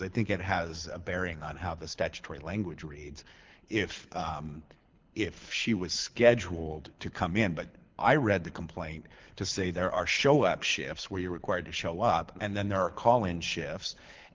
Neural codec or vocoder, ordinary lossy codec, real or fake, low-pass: codec, 24 kHz, 6 kbps, HILCodec; Opus, 32 kbps; fake; 7.2 kHz